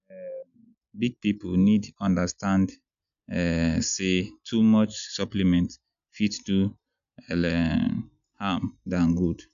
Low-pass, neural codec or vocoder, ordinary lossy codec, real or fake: 7.2 kHz; none; none; real